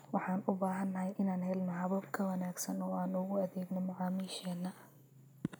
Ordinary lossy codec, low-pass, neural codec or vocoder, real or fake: none; none; none; real